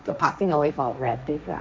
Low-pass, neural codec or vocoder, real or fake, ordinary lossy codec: none; codec, 16 kHz, 1.1 kbps, Voila-Tokenizer; fake; none